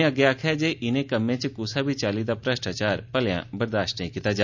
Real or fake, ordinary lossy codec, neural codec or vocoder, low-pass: real; none; none; 7.2 kHz